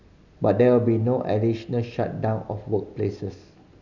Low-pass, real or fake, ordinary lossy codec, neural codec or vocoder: 7.2 kHz; real; none; none